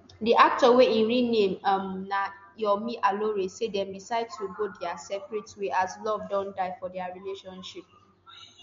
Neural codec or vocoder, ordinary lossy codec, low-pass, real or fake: none; MP3, 48 kbps; 7.2 kHz; real